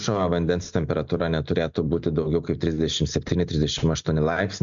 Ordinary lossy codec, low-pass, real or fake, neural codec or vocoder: MP3, 64 kbps; 7.2 kHz; real; none